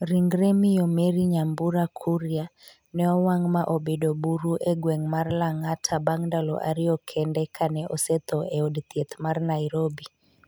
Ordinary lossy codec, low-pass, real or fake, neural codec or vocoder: none; none; real; none